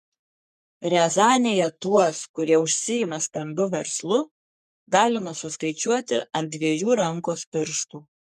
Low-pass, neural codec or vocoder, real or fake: 14.4 kHz; codec, 44.1 kHz, 3.4 kbps, Pupu-Codec; fake